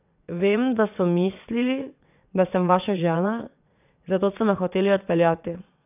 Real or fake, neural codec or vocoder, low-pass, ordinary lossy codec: fake; vocoder, 22.05 kHz, 80 mel bands, WaveNeXt; 3.6 kHz; none